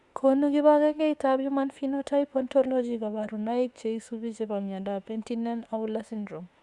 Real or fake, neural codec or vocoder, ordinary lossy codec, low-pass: fake; autoencoder, 48 kHz, 32 numbers a frame, DAC-VAE, trained on Japanese speech; Opus, 64 kbps; 10.8 kHz